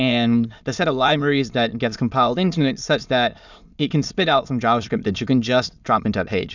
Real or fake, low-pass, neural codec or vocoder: fake; 7.2 kHz; autoencoder, 22.05 kHz, a latent of 192 numbers a frame, VITS, trained on many speakers